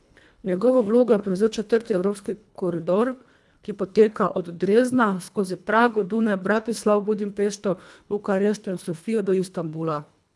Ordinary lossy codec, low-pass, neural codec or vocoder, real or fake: none; none; codec, 24 kHz, 1.5 kbps, HILCodec; fake